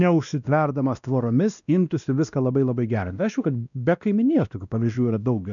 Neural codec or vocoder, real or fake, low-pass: codec, 16 kHz, 1 kbps, X-Codec, WavLM features, trained on Multilingual LibriSpeech; fake; 7.2 kHz